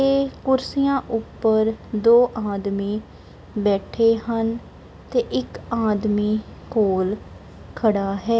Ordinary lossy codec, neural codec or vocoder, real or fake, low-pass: none; none; real; none